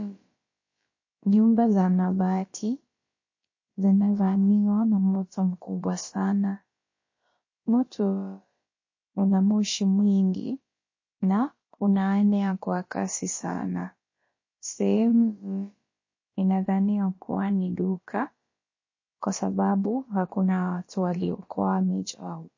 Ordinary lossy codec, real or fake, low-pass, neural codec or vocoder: MP3, 32 kbps; fake; 7.2 kHz; codec, 16 kHz, about 1 kbps, DyCAST, with the encoder's durations